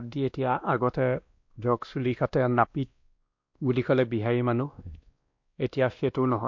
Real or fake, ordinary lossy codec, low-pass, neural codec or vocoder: fake; MP3, 48 kbps; 7.2 kHz; codec, 16 kHz, 1 kbps, X-Codec, WavLM features, trained on Multilingual LibriSpeech